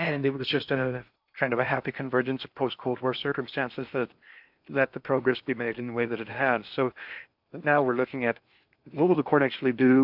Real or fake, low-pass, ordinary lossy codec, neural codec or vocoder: fake; 5.4 kHz; AAC, 48 kbps; codec, 16 kHz in and 24 kHz out, 0.8 kbps, FocalCodec, streaming, 65536 codes